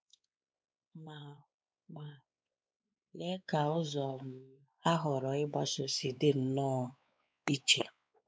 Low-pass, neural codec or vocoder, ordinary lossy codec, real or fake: none; codec, 16 kHz, 4 kbps, X-Codec, WavLM features, trained on Multilingual LibriSpeech; none; fake